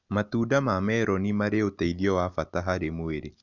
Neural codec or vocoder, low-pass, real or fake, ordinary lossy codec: none; 7.2 kHz; real; none